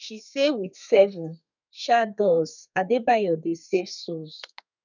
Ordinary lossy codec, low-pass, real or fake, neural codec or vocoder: none; 7.2 kHz; fake; codec, 32 kHz, 1.9 kbps, SNAC